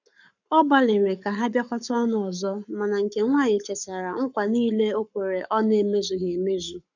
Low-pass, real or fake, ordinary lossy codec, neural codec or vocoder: 7.2 kHz; fake; none; codec, 44.1 kHz, 7.8 kbps, Pupu-Codec